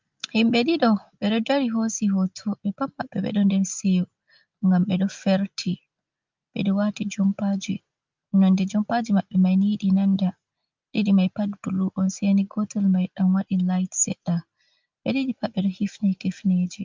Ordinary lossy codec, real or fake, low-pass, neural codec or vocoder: Opus, 24 kbps; real; 7.2 kHz; none